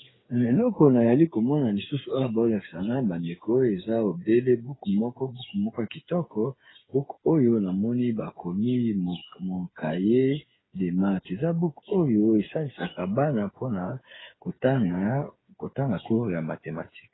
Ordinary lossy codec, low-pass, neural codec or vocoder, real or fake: AAC, 16 kbps; 7.2 kHz; codec, 16 kHz, 8 kbps, FreqCodec, smaller model; fake